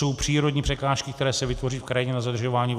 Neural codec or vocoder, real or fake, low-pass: none; real; 14.4 kHz